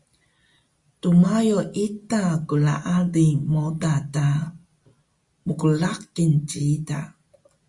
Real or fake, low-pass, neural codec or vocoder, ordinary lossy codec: real; 10.8 kHz; none; Opus, 64 kbps